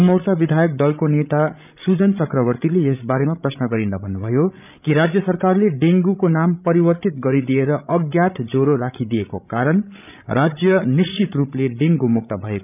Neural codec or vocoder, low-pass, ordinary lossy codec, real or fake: codec, 16 kHz, 16 kbps, FreqCodec, larger model; 3.6 kHz; none; fake